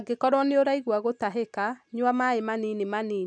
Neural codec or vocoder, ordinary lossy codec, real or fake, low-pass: none; none; real; 9.9 kHz